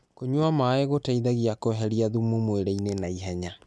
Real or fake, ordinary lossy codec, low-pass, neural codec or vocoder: real; none; none; none